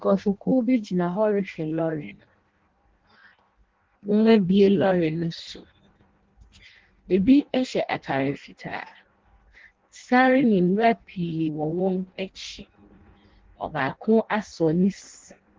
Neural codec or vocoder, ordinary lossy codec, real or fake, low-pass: codec, 16 kHz in and 24 kHz out, 0.6 kbps, FireRedTTS-2 codec; Opus, 16 kbps; fake; 7.2 kHz